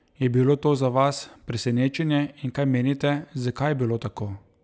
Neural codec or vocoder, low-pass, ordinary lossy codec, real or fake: none; none; none; real